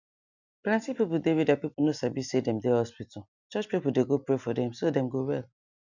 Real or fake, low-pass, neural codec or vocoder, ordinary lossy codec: real; 7.2 kHz; none; none